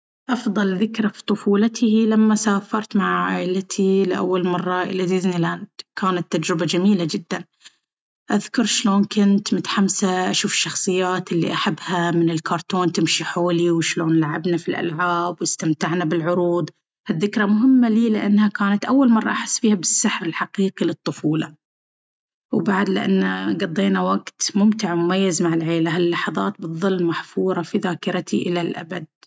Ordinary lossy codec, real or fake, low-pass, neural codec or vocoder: none; real; none; none